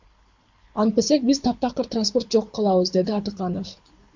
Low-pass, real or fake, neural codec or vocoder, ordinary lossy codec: 7.2 kHz; fake; codec, 24 kHz, 6 kbps, HILCodec; MP3, 64 kbps